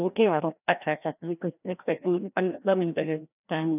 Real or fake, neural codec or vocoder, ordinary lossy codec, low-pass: fake; codec, 16 kHz, 1 kbps, FreqCodec, larger model; none; 3.6 kHz